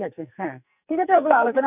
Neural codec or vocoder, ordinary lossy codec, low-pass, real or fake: codec, 44.1 kHz, 2.6 kbps, SNAC; none; 3.6 kHz; fake